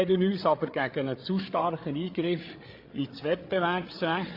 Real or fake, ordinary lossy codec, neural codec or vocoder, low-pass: fake; AAC, 32 kbps; codec, 16 kHz, 8 kbps, FreqCodec, larger model; 5.4 kHz